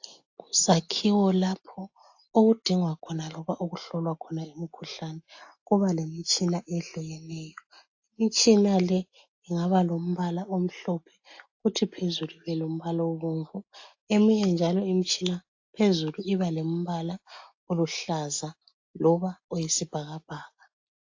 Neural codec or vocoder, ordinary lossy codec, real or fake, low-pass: none; AAC, 48 kbps; real; 7.2 kHz